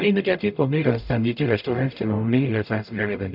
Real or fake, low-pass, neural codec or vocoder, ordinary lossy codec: fake; 5.4 kHz; codec, 44.1 kHz, 0.9 kbps, DAC; none